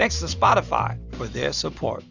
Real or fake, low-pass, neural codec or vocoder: real; 7.2 kHz; none